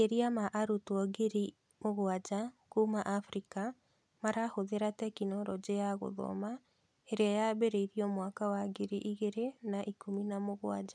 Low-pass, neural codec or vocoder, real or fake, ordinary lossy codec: none; none; real; none